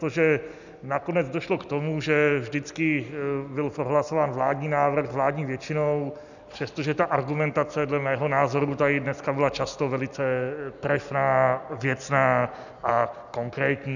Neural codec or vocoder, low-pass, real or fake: none; 7.2 kHz; real